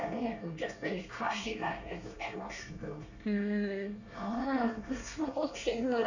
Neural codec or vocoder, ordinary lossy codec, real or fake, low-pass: codec, 24 kHz, 1 kbps, SNAC; none; fake; 7.2 kHz